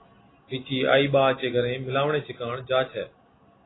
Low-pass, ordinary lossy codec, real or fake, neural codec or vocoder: 7.2 kHz; AAC, 16 kbps; real; none